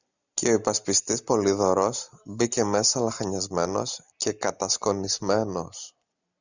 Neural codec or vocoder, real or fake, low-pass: none; real; 7.2 kHz